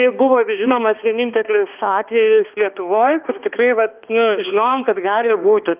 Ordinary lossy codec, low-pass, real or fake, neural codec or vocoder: Opus, 32 kbps; 3.6 kHz; fake; codec, 16 kHz, 2 kbps, X-Codec, HuBERT features, trained on balanced general audio